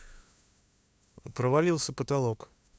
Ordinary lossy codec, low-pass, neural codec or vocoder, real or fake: none; none; codec, 16 kHz, 2 kbps, FunCodec, trained on LibriTTS, 25 frames a second; fake